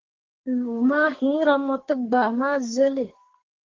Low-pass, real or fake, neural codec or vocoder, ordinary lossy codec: 7.2 kHz; fake; codec, 44.1 kHz, 2.6 kbps, SNAC; Opus, 16 kbps